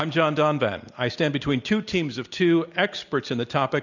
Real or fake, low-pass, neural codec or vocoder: real; 7.2 kHz; none